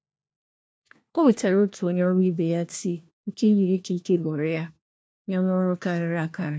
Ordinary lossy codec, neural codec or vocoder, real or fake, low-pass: none; codec, 16 kHz, 1 kbps, FunCodec, trained on LibriTTS, 50 frames a second; fake; none